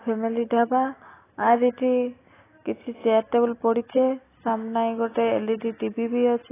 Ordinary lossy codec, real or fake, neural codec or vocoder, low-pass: AAC, 16 kbps; real; none; 3.6 kHz